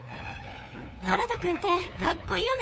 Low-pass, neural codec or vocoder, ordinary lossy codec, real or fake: none; codec, 16 kHz, 2 kbps, FunCodec, trained on LibriTTS, 25 frames a second; none; fake